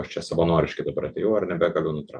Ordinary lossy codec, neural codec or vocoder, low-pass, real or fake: MP3, 64 kbps; none; 9.9 kHz; real